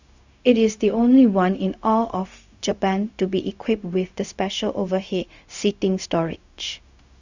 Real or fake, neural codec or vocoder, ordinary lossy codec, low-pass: fake; codec, 16 kHz, 0.4 kbps, LongCat-Audio-Codec; Opus, 64 kbps; 7.2 kHz